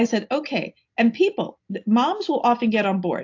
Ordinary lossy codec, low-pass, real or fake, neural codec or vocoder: AAC, 48 kbps; 7.2 kHz; real; none